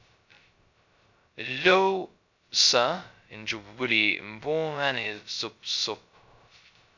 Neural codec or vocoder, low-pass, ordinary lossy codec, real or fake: codec, 16 kHz, 0.2 kbps, FocalCodec; 7.2 kHz; MP3, 64 kbps; fake